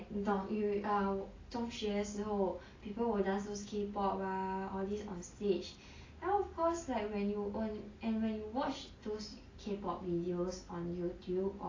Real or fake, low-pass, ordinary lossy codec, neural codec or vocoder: real; 7.2 kHz; AAC, 32 kbps; none